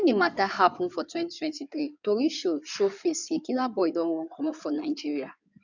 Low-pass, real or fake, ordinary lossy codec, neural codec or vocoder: 7.2 kHz; fake; none; codec, 16 kHz in and 24 kHz out, 2.2 kbps, FireRedTTS-2 codec